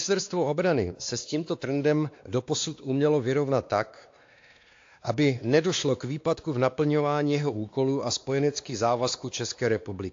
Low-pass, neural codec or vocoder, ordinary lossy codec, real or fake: 7.2 kHz; codec, 16 kHz, 2 kbps, X-Codec, WavLM features, trained on Multilingual LibriSpeech; AAC, 48 kbps; fake